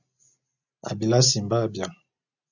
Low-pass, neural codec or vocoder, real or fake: 7.2 kHz; none; real